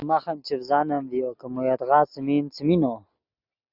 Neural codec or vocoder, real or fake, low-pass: none; real; 5.4 kHz